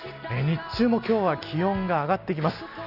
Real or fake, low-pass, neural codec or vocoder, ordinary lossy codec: real; 5.4 kHz; none; Opus, 64 kbps